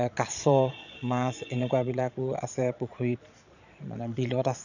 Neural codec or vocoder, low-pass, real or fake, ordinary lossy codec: vocoder, 22.05 kHz, 80 mel bands, Vocos; 7.2 kHz; fake; none